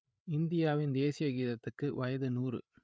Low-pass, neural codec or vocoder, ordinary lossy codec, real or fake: none; codec, 16 kHz, 8 kbps, FreqCodec, larger model; none; fake